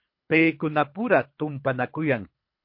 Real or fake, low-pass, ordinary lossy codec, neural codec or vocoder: fake; 5.4 kHz; MP3, 32 kbps; codec, 24 kHz, 3 kbps, HILCodec